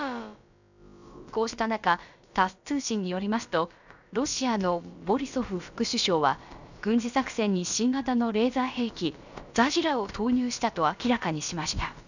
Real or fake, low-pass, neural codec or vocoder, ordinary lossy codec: fake; 7.2 kHz; codec, 16 kHz, about 1 kbps, DyCAST, with the encoder's durations; none